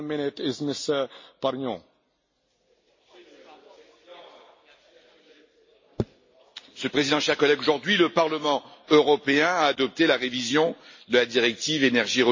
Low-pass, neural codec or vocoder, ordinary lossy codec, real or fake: 7.2 kHz; none; MP3, 32 kbps; real